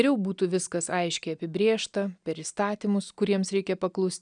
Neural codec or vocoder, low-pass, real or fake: none; 9.9 kHz; real